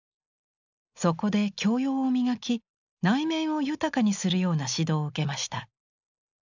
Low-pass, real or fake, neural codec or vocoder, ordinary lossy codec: 7.2 kHz; real; none; none